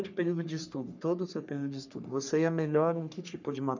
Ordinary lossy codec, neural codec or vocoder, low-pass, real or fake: none; codec, 44.1 kHz, 3.4 kbps, Pupu-Codec; 7.2 kHz; fake